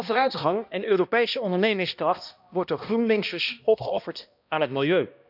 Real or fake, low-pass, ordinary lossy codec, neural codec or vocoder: fake; 5.4 kHz; none; codec, 16 kHz, 1 kbps, X-Codec, HuBERT features, trained on balanced general audio